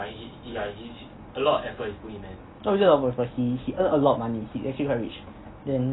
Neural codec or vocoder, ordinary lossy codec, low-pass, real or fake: none; AAC, 16 kbps; 7.2 kHz; real